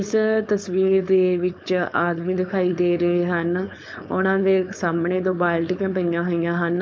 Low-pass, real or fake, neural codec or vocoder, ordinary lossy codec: none; fake; codec, 16 kHz, 4.8 kbps, FACodec; none